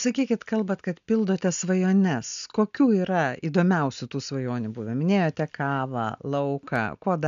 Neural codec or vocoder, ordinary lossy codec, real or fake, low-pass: none; AAC, 96 kbps; real; 7.2 kHz